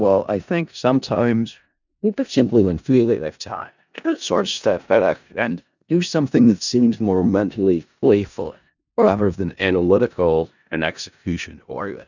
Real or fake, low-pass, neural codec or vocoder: fake; 7.2 kHz; codec, 16 kHz in and 24 kHz out, 0.4 kbps, LongCat-Audio-Codec, four codebook decoder